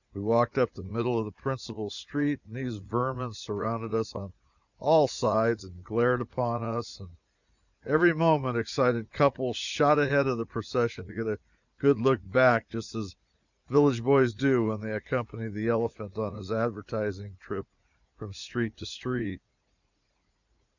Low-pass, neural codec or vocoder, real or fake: 7.2 kHz; vocoder, 44.1 kHz, 80 mel bands, Vocos; fake